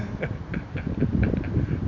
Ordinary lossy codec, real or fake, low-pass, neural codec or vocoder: none; real; 7.2 kHz; none